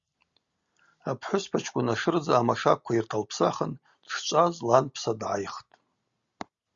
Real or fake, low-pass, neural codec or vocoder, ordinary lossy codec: real; 7.2 kHz; none; Opus, 64 kbps